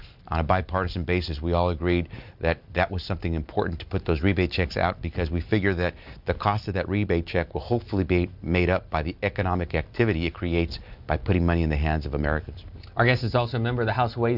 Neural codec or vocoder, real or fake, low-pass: none; real; 5.4 kHz